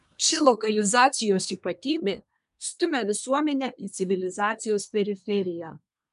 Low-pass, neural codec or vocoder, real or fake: 10.8 kHz; codec, 24 kHz, 1 kbps, SNAC; fake